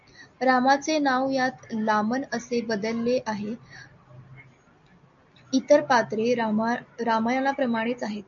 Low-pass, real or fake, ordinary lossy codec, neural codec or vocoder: 7.2 kHz; real; MP3, 48 kbps; none